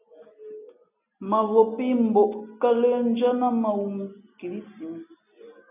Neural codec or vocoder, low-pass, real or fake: none; 3.6 kHz; real